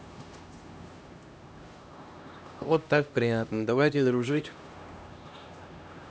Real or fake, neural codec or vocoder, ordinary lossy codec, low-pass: fake; codec, 16 kHz, 1 kbps, X-Codec, HuBERT features, trained on LibriSpeech; none; none